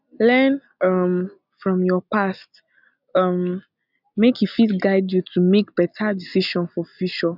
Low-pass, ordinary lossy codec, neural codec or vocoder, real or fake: 5.4 kHz; none; none; real